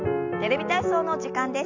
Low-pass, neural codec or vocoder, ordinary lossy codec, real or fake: 7.2 kHz; none; none; real